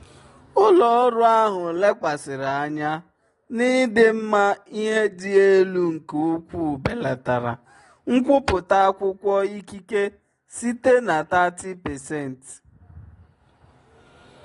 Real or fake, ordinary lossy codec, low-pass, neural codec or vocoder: real; AAC, 32 kbps; 10.8 kHz; none